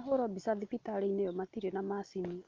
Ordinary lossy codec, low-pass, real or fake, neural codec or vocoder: Opus, 24 kbps; 7.2 kHz; real; none